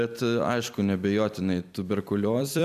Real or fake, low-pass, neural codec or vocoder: fake; 14.4 kHz; vocoder, 44.1 kHz, 128 mel bands every 256 samples, BigVGAN v2